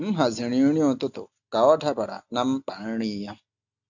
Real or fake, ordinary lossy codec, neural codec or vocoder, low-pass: real; none; none; 7.2 kHz